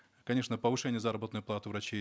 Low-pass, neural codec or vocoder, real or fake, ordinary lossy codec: none; none; real; none